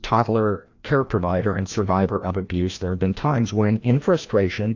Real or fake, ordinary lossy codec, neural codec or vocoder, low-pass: fake; AAC, 48 kbps; codec, 16 kHz, 1 kbps, FreqCodec, larger model; 7.2 kHz